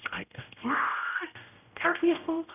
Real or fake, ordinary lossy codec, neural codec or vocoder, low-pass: fake; none; codec, 16 kHz, 0.5 kbps, X-Codec, HuBERT features, trained on general audio; 3.6 kHz